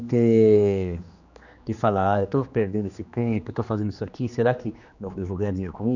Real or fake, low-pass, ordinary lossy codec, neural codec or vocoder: fake; 7.2 kHz; none; codec, 16 kHz, 2 kbps, X-Codec, HuBERT features, trained on general audio